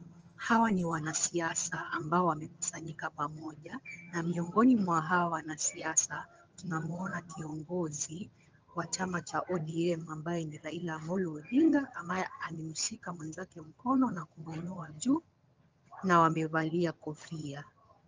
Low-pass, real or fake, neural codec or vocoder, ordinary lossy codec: 7.2 kHz; fake; vocoder, 22.05 kHz, 80 mel bands, HiFi-GAN; Opus, 24 kbps